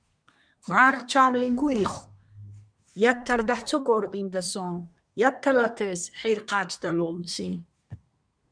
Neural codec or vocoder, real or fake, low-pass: codec, 24 kHz, 1 kbps, SNAC; fake; 9.9 kHz